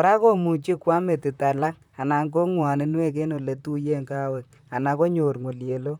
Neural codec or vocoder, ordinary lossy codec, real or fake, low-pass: vocoder, 44.1 kHz, 128 mel bands, Pupu-Vocoder; none; fake; 19.8 kHz